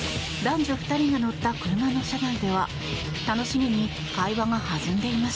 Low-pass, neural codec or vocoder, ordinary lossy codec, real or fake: none; none; none; real